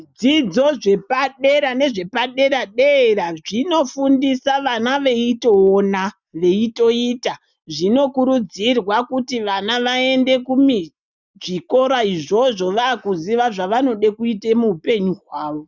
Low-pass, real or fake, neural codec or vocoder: 7.2 kHz; real; none